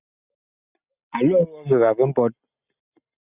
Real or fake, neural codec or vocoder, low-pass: real; none; 3.6 kHz